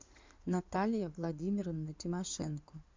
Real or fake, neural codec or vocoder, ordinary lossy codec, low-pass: fake; codec, 16 kHz in and 24 kHz out, 2.2 kbps, FireRedTTS-2 codec; MP3, 64 kbps; 7.2 kHz